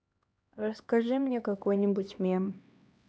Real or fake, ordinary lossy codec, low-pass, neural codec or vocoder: fake; none; none; codec, 16 kHz, 2 kbps, X-Codec, HuBERT features, trained on LibriSpeech